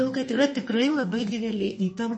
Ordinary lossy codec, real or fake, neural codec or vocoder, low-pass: MP3, 32 kbps; fake; codec, 32 kHz, 1.9 kbps, SNAC; 9.9 kHz